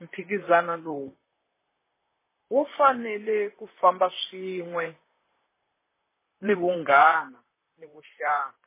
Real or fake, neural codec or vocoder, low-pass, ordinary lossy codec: fake; vocoder, 44.1 kHz, 128 mel bands, Pupu-Vocoder; 3.6 kHz; MP3, 16 kbps